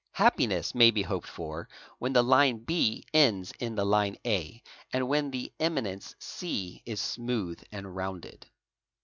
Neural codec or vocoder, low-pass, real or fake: none; 7.2 kHz; real